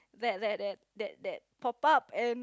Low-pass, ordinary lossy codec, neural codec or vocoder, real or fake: none; none; none; real